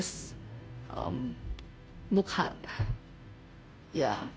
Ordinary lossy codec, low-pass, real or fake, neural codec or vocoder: none; none; fake; codec, 16 kHz, 0.5 kbps, FunCodec, trained on Chinese and English, 25 frames a second